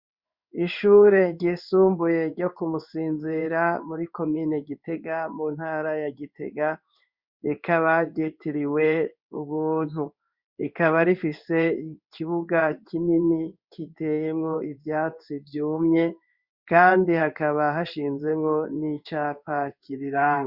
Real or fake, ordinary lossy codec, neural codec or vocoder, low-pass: fake; Opus, 64 kbps; codec, 16 kHz in and 24 kHz out, 1 kbps, XY-Tokenizer; 5.4 kHz